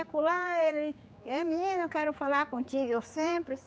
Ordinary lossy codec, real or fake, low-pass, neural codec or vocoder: none; fake; none; codec, 16 kHz, 4 kbps, X-Codec, HuBERT features, trained on general audio